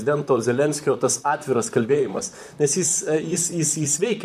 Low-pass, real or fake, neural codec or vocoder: 14.4 kHz; fake; vocoder, 44.1 kHz, 128 mel bands, Pupu-Vocoder